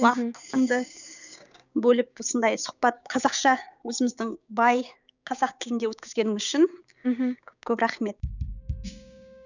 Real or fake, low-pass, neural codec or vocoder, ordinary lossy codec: fake; 7.2 kHz; codec, 16 kHz, 6 kbps, DAC; none